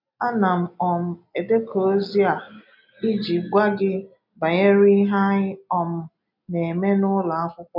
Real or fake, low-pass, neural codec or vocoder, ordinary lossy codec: real; 5.4 kHz; none; none